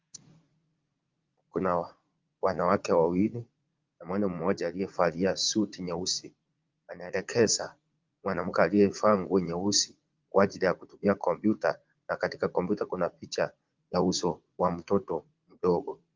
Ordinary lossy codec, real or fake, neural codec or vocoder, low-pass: Opus, 32 kbps; fake; vocoder, 44.1 kHz, 80 mel bands, Vocos; 7.2 kHz